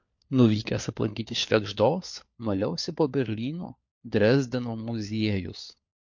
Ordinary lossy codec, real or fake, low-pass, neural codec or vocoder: MP3, 48 kbps; fake; 7.2 kHz; codec, 16 kHz, 4 kbps, FunCodec, trained on LibriTTS, 50 frames a second